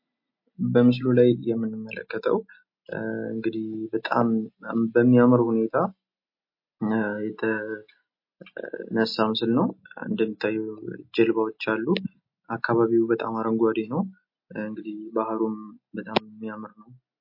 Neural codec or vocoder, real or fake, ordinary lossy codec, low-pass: none; real; MP3, 32 kbps; 5.4 kHz